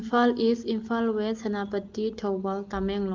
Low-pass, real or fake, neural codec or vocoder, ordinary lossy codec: 7.2 kHz; real; none; Opus, 32 kbps